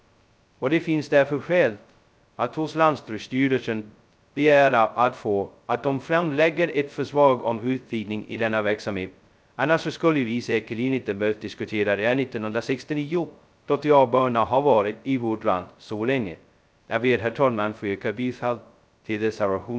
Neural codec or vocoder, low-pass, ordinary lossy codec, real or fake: codec, 16 kHz, 0.2 kbps, FocalCodec; none; none; fake